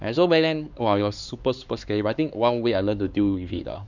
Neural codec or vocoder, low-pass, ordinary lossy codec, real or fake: codec, 16 kHz, 4 kbps, X-Codec, HuBERT features, trained on LibriSpeech; 7.2 kHz; none; fake